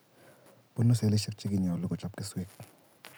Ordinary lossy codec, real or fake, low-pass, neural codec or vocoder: none; real; none; none